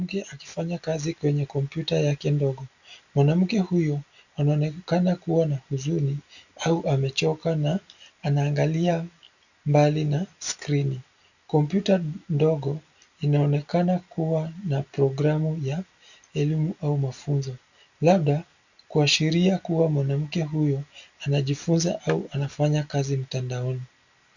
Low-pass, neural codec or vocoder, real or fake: 7.2 kHz; none; real